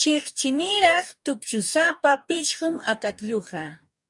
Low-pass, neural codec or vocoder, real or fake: 10.8 kHz; codec, 44.1 kHz, 2.6 kbps, DAC; fake